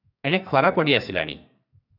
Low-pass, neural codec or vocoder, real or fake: 5.4 kHz; codec, 44.1 kHz, 2.6 kbps, SNAC; fake